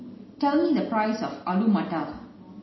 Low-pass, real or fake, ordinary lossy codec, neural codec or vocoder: 7.2 kHz; real; MP3, 24 kbps; none